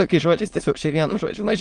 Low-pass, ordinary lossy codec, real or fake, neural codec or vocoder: 9.9 kHz; Opus, 24 kbps; fake; autoencoder, 22.05 kHz, a latent of 192 numbers a frame, VITS, trained on many speakers